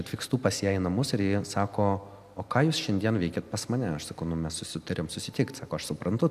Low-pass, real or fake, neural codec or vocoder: 14.4 kHz; real; none